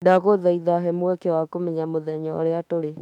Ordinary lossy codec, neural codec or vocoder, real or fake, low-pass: none; autoencoder, 48 kHz, 32 numbers a frame, DAC-VAE, trained on Japanese speech; fake; 19.8 kHz